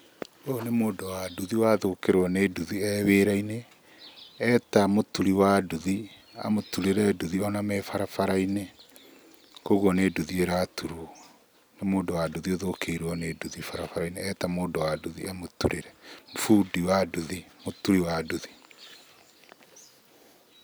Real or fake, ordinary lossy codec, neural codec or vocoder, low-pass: real; none; none; none